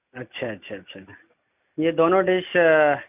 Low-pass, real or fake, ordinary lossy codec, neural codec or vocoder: 3.6 kHz; real; none; none